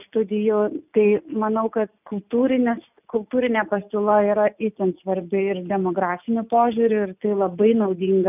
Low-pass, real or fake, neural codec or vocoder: 3.6 kHz; real; none